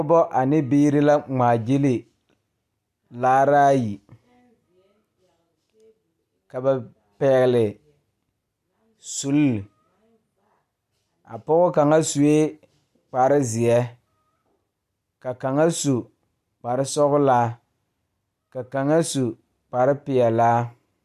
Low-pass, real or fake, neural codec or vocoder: 14.4 kHz; real; none